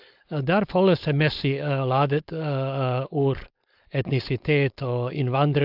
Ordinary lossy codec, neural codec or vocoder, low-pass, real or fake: AAC, 48 kbps; codec, 16 kHz, 4.8 kbps, FACodec; 5.4 kHz; fake